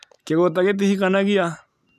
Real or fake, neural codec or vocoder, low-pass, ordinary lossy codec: real; none; 14.4 kHz; none